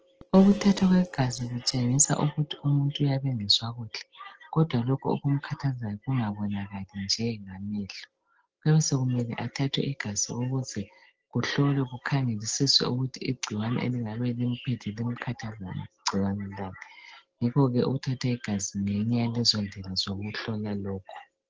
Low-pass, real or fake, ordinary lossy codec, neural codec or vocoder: 7.2 kHz; real; Opus, 16 kbps; none